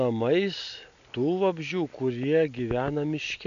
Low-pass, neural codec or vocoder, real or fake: 7.2 kHz; none; real